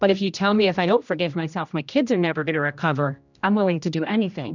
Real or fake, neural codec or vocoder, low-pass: fake; codec, 16 kHz, 1 kbps, X-Codec, HuBERT features, trained on general audio; 7.2 kHz